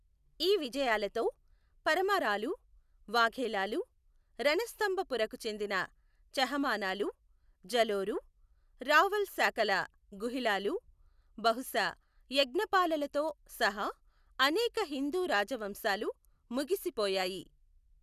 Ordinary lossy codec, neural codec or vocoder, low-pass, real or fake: none; none; 14.4 kHz; real